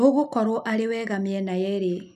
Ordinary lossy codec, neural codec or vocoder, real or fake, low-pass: none; none; real; 14.4 kHz